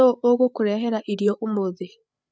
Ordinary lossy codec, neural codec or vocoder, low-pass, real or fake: none; codec, 16 kHz, 8 kbps, FreqCodec, larger model; none; fake